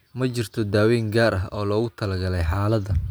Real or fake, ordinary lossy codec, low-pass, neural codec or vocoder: real; none; none; none